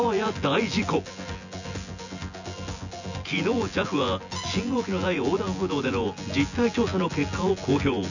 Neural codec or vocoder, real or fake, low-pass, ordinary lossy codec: vocoder, 24 kHz, 100 mel bands, Vocos; fake; 7.2 kHz; none